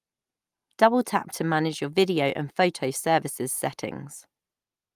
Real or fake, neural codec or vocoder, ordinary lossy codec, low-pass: real; none; Opus, 32 kbps; 14.4 kHz